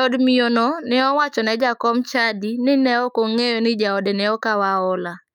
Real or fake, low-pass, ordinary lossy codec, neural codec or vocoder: fake; 19.8 kHz; none; autoencoder, 48 kHz, 128 numbers a frame, DAC-VAE, trained on Japanese speech